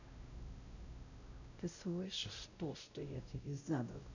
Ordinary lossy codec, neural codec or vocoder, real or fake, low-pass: none; codec, 16 kHz, 0.5 kbps, X-Codec, WavLM features, trained on Multilingual LibriSpeech; fake; 7.2 kHz